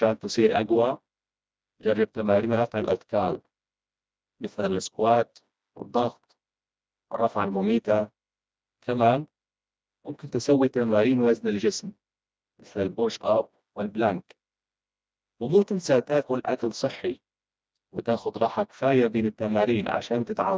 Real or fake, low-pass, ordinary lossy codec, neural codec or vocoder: fake; none; none; codec, 16 kHz, 1 kbps, FreqCodec, smaller model